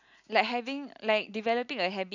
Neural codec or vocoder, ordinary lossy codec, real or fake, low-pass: none; none; real; 7.2 kHz